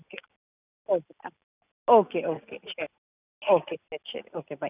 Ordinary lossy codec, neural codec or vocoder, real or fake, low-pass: none; none; real; 3.6 kHz